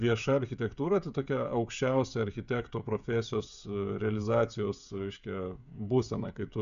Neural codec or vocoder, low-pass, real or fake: codec, 16 kHz, 16 kbps, FreqCodec, smaller model; 7.2 kHz; fake